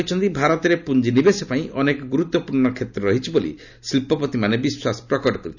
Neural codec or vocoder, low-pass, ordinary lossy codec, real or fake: none; 7.2 kHz; none; real